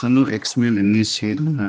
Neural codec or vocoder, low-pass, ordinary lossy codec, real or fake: codec, 16 kHz, 1 kbps, X-Codec, HuBERT features, trained on general audio; none; none; fake